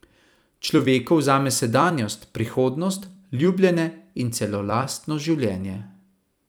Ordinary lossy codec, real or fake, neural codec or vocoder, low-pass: none; fake; vocoder, 44.1 kHz, 128 mel bands every 256 samples, BigVGAN v2; none